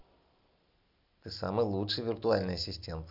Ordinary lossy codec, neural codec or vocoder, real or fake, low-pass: none; none; real; 5.4 kHz